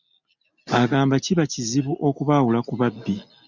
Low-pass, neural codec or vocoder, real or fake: 7.2 kHz; none; real